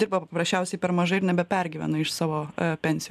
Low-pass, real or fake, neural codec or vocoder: 14.4 kHz; real; none